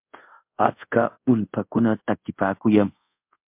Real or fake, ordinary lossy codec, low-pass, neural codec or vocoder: fake; MP3, 32 kbps; 3.6 kHz; codec, 24 kHz, 0.5 kbps, DualCodec